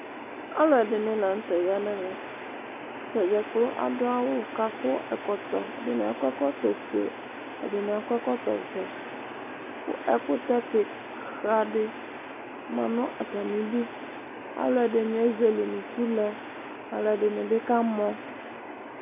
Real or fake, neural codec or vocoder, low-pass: real; none; 3.6 kHz